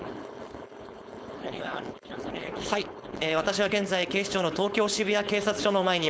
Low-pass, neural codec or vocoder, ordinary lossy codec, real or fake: none; codec, 16 kHz, 4.8 kbps, FACodec; none; fake